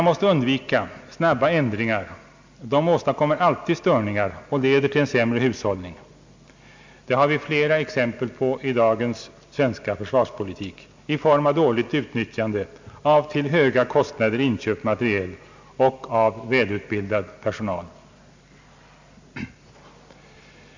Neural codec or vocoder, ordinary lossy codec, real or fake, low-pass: none; MP3, 64 kbps; real; 7.2 kHz